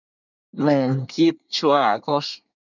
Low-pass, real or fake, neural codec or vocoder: 7.2 kHz; fake; codec, 24 kHz, 1 kbps, SNAC